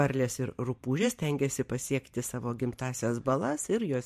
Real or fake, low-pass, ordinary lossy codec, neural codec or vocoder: fake; 14.4 kHz; MP3, 64 kbps; vocoder, 44.1 kHz, 128 mel bands every 512 samples, BigVGAN v2